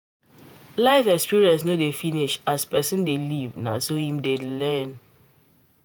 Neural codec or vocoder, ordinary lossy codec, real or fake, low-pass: vocoder, 48 kHz, 128 mel bands, Vocos; none; fake; none